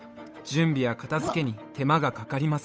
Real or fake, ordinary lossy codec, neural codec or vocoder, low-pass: fake; none; codec, 16 kHz, 8 kbps, FunCodec, trained on Chinese and English, 25 frames a second; none